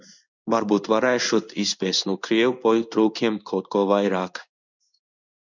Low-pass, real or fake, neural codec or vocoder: 7.2 kHz; fake; codec, 16 kHz in and 24 kHz out, 1 kbps, XY-Tokenizer